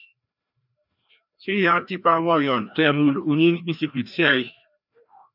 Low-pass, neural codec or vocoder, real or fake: 5.4 kHz; codec, 16 kHz, 1 kbps, FreqCodec, larger model; fake